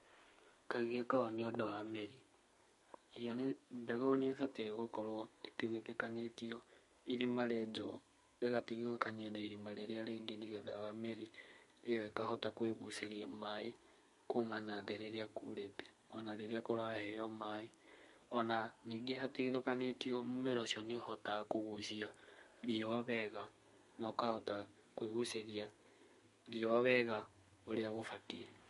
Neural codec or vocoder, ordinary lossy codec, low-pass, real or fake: codec, 32 kHz, 1.9 kbps, SNAC; MP3, 48 kbps; 14.4 kHz; fake